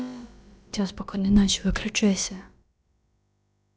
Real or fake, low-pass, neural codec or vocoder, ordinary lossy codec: fake; none; codec, 16 kHz, about 1 kbps, DyCAST, with the encoder's durations; none